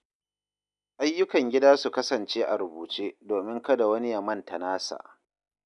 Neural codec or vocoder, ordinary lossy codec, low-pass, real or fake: none; none; 10.8 kHz; real